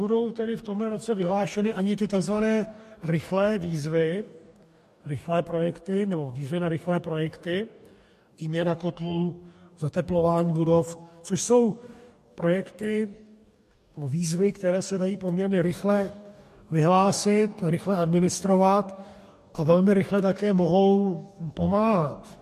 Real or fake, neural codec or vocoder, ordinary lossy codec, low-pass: fake; codec, 44.1 kHz, 2.6 kbps, DAC; MP3, 64 kbps; 14.4 kHz